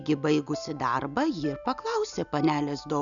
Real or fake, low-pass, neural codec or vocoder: real; 7.2 kHz; none